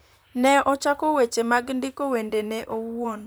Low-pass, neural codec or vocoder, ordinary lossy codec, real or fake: none; vocoder, 44.1 kHz, 128 mel bands, Pupu-Vocoder; none; fake